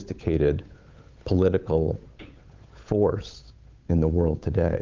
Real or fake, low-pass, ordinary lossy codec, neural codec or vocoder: fake; 7.2 kHz; Opus, 24 kbps; codec, 16 kHz, 8 kbps, FunCodec, trained on Chinese and English, 25 frames a second